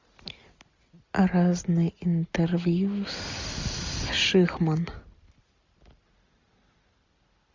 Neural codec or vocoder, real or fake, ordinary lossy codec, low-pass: none; real; MP3, 64 kbps; 7.2 kHz